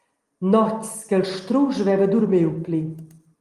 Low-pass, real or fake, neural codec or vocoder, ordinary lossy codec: 14.4 kHz; real; none; Opus, 24 kbps